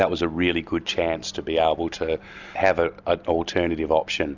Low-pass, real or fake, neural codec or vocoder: 7.2 kHz; real; none